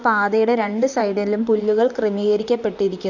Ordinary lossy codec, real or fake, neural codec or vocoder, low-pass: none; fake; vocoder, 44.1 kHz, 128 mel bands, Pupu-Vocoder; 7.2 kHz